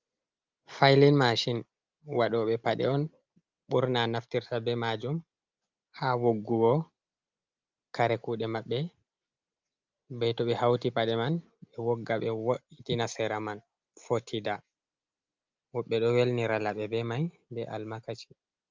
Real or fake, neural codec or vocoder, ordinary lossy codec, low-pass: real; none; Opus, 24 kbps; 7.2 kHz